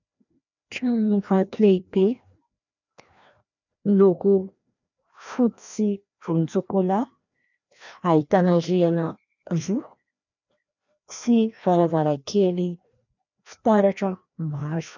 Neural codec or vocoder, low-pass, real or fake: codec, 16 kHz, 1 kbps, FreqCodec, larger model; 7.2 kHz; fake